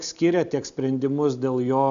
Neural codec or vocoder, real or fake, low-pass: none; real; 7.2 kHz